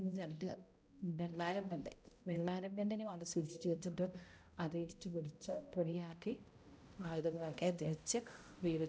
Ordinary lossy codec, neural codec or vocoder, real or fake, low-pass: none; codec, 16 kHz, 0.5 kbps, X-Codec, HuBERT features, trained on balanced general audio; fake; none